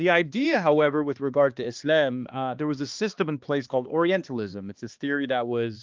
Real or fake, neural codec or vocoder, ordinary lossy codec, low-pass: fake; codec, 16 kHz, 2 kbps, X-Codec, HuBERT features, trained on balanced general audio; Opus, 24 kbps; 7.2 kHz